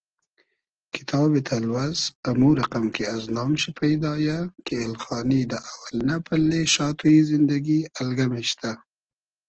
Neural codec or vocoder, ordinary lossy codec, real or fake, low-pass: none; Opus, 16 kbps; real; 7.2 kHz